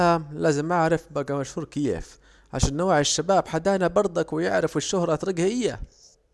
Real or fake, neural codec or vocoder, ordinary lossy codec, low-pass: real; none; none; none